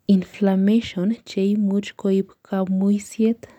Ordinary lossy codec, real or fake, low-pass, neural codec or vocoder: none; real; 19.8 kHz; none